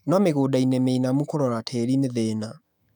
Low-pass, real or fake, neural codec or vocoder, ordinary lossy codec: 19.8 kHz; fake; autoencoder, 48 kHz, 128 numbers a frame, DAC-VAE, trained on Japanese speech; none